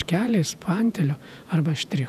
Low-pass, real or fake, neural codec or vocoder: 14.4 kHz; fake; vocoder, 48 kHz, 128 mel bands, Vocos